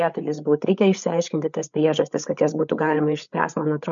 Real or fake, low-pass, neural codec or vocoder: fake; 7.2 kHz; codec, 16 kHz, 8 kbps, FreqCodec, larger model